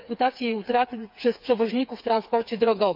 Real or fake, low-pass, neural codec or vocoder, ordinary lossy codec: fake; 5.4 kHz; codec, 16 kHz, 4 kbps, FreqCodec, smaller model; MP3, 48 kbps